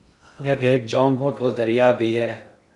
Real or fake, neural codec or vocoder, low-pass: fake; codec, 16 kHz in and 24 kHz out, 0.6 kbps, FocalCodec, streaming, 2048 codes; 10.8 kHz